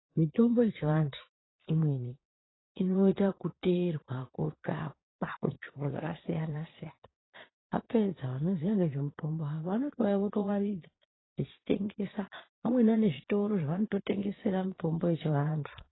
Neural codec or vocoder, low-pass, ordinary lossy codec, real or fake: vocoder, 22.05 kHz, 80 mel bands, Vocos; 7.2 kHz; AAC, 16 kbps; fake